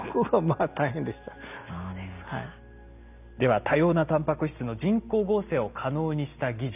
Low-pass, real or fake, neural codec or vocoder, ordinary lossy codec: 3.6 kHz; real; none; none